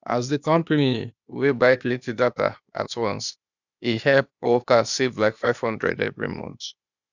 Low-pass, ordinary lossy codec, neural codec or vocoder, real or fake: 7.2 kHz; none; codec, 16 kHz, 0.8 kbps, ZipCodec; fake